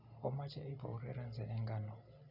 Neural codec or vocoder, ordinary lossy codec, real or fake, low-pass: none; none; real; 5.4 kHz